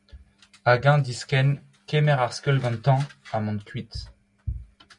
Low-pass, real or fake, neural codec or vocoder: 10.8 kHz; real; none